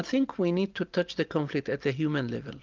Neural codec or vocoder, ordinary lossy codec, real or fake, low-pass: none; Opus, 32 kbps; real; 7.2 kHz